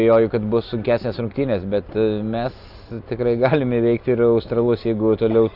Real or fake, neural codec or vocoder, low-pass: real; none; 5.4 kHz